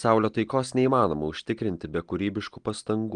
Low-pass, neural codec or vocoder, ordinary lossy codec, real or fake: 9.9 kHz; none; Opus, 24 kbps; real